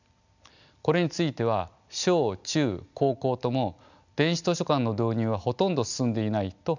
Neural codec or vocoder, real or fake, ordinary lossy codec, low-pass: none; real; none; 7.2 kHz